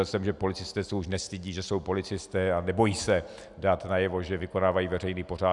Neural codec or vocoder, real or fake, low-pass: none; real; 10.8 kHz